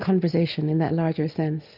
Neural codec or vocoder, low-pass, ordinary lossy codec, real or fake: none; 5.4 kHz; Opus, 24 kbps; real